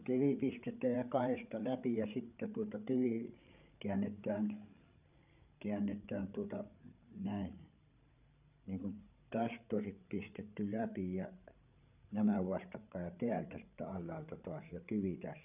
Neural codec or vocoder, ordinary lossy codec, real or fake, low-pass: codec, 16 kHz, 8 kbps, FreqCodec, larger model; none; fake; 3.6 kHz